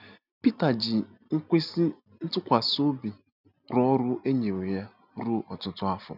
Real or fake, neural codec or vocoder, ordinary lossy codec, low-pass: real; none; none; 5.4 kHz